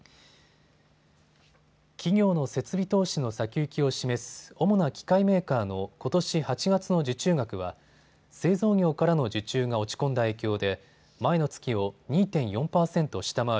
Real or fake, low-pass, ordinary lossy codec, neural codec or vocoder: real; none; none; none